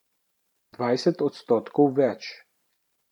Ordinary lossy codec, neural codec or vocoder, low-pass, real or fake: none; vocoder, 44.1 kHz, 128 mel bands every 512 samples, BigVGAN v2; 19.8 kHz; fake